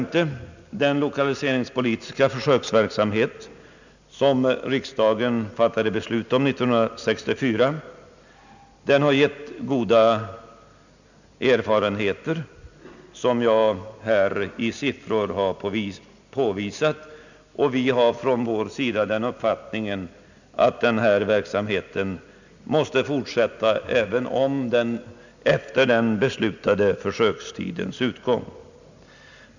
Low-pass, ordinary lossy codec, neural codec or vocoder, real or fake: 7.2 kHz; none; none; real